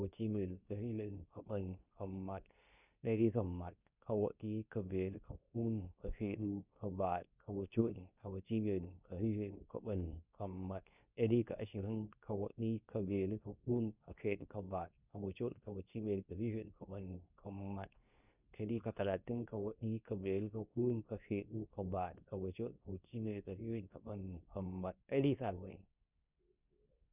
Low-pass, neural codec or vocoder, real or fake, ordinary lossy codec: 3.6 kHz; codec, 24 kHz, 0.9 kbps, WavTokenizer, medium speech release version 2; fake; none